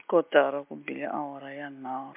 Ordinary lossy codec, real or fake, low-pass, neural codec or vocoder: MP3, 32 kbps; real; 3.6 kHz; none